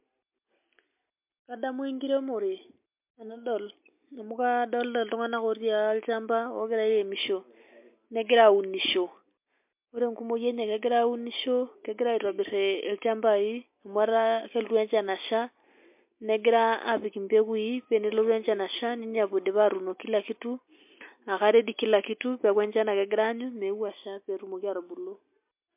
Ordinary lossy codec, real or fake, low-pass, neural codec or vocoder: MP3, 32 kbps; real; 3.6 kHz; none